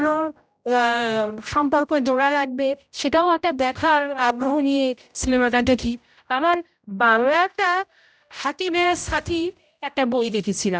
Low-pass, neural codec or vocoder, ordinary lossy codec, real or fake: none; codec, 16 kHz, 0.5 kbps, X-Codec, HuBERT features, trained on general audio; none; fake